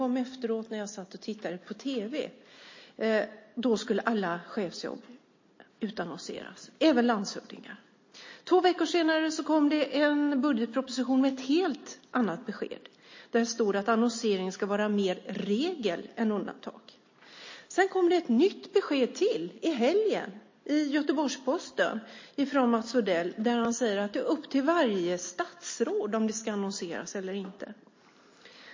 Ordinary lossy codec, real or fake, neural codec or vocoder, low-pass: MP3, 32 kbps; real; none; 7.2 kHz